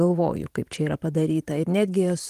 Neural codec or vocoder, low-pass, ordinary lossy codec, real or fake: vocoder, 44.1 kHz, 128 mel bands every 512 samples, BigVGAN v2; 14.4 kHz; Opus, 24 kbps; fake